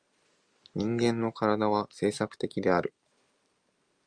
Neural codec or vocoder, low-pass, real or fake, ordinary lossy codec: none; 9.9 kHz; real; Opus, 32 kbps